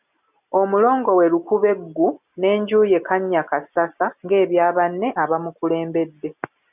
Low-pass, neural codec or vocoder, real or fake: 3.6 kHz; none; real